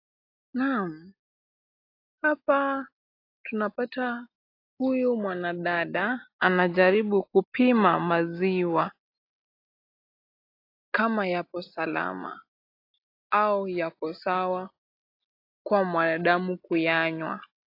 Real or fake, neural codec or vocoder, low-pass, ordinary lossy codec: real; none; 5.4 kHz; AAC, 32 kbps